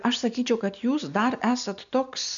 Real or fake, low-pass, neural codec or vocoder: real; 7.2 kHz; none